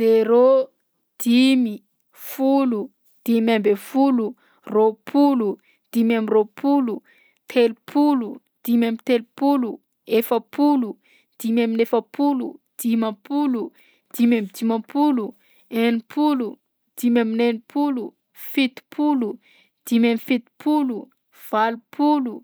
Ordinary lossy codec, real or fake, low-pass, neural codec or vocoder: none; real; none; none